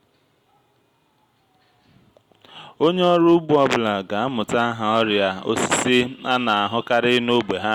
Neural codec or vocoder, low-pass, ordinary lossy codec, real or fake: none; 19.8 kHz; Opus, 64 kbps; real